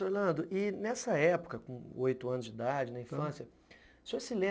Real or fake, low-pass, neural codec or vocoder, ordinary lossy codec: real; none; none; none